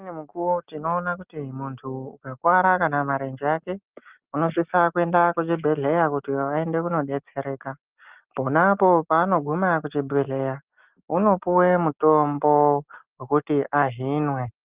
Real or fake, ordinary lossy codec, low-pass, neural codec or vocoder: real; Opus, 24 kbps; 3.6 kHz; none